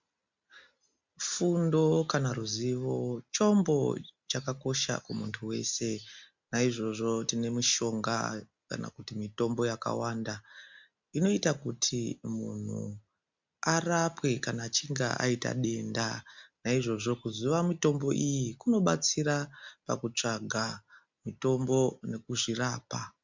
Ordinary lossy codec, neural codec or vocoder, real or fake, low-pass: MP3, 64 kbps; none; real; 7.2 kHz